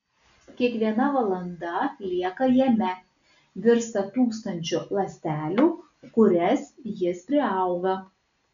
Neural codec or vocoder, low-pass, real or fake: none; 7.2 kHz; real